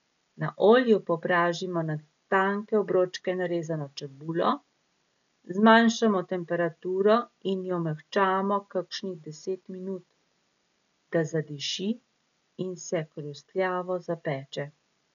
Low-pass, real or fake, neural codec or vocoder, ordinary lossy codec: 7.2 kHz; real; none; none